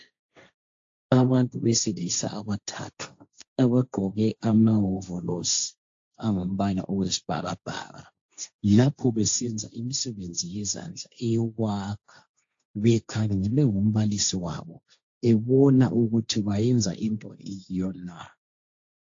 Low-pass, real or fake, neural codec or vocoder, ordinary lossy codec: 7.2 kHz; fake; codec, 16 kHz, 1.1 kbps, Voila-Tokenizer; AAC, 48 kbps